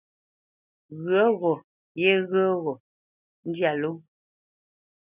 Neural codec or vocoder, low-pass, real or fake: none; 3.6 kHz; real